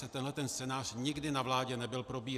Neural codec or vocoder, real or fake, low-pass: none; real; 14.4 kHz